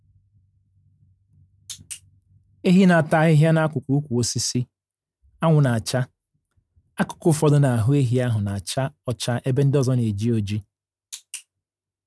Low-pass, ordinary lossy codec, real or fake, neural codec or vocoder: none; none; real; none